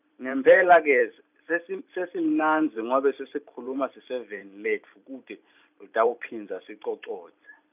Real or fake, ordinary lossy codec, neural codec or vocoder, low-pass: fake; none; vocoder, 44.1 kHz, 128 mel bands every 512 samples, BigVGAN v2; 3.6 kHz